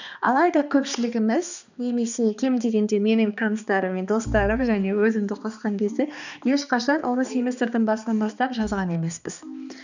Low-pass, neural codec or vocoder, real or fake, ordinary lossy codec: 7.2 kHz; codec, 16 kHz, 2 kbps, X-Codec, HuBERT features, trained on balanced general audio; fake; none